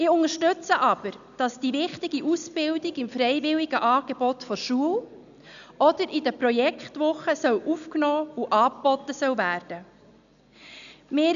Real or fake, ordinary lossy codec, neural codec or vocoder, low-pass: real; none; none; 7.2 kHz